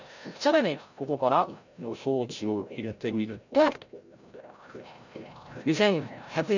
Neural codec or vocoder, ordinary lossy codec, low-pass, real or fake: codec, 16 kHz, 0.5 kbps, FreqCodec, larger model; none; 7.2 kHz; fake